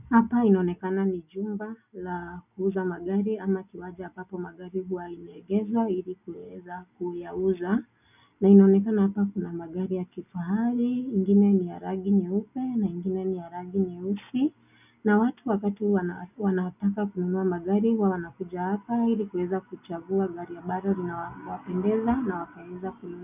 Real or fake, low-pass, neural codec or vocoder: real; 3.6 kHz; none